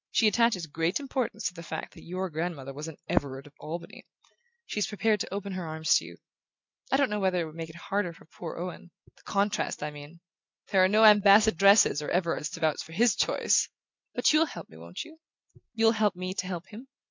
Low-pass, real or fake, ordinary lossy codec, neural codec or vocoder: 7.2 kHz; real; MP3, 64 kbps; none